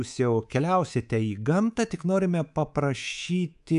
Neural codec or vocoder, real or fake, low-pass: codec, 24 kHz, 3.1 kbps, DualCodec; fake; 10.8 kHz